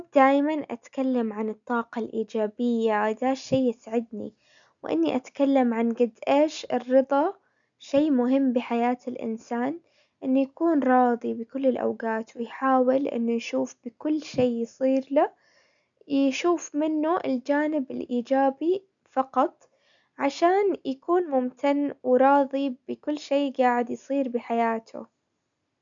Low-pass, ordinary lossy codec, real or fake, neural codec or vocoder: 7.2 kHz; none; real; none